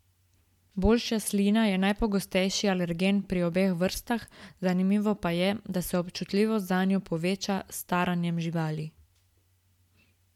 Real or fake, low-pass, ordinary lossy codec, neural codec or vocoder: real; 19.8 kHz; MP3, 96 kbps; none